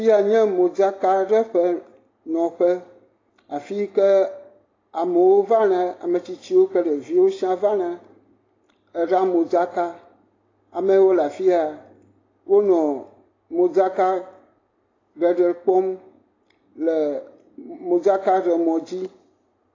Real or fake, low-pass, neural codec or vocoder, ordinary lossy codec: real; 7.2 kHz; none; AAC, 32 kbps